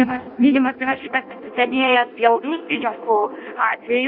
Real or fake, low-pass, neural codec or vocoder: fake; 5.4 kHz; codec, 16 kHz in and 24 kHz out, 0.6 kbps, FireRedTTS-2 codec